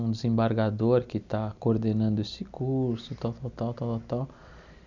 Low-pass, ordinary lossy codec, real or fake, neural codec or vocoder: 7.2 kHz; none; real; none